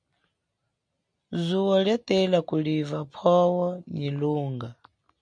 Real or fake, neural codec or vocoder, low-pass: real; none; 9.9 kHz